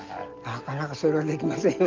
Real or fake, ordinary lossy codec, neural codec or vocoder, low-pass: real; Opus, 24 kbps; none; 7.2 kHz